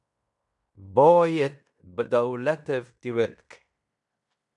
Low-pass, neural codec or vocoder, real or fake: 10.8 kHz; codec, 16 kHz in and 24 kHz out, 0.9 kbps, LongCat-Audio-Codec, fine tuned four codebook decoder; fake